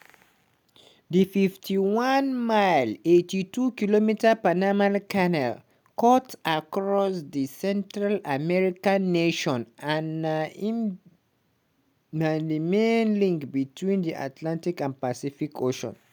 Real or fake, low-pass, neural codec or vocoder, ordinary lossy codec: real; none; none; none